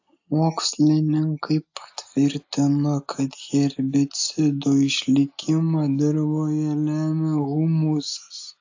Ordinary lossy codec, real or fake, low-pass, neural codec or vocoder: AAC, 48 kbps; real; 7.2 kHz; none